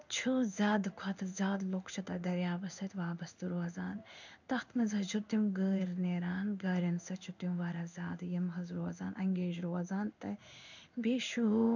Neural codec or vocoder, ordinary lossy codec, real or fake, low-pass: codec, 16 kHz in and 24 kHz out, 1 kbps, XY-Tokenizer; none; fake; 7.2 kHz